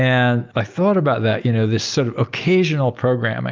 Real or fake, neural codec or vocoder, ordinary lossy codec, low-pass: real; none; Opus, 24 kbps; 7.2 kHz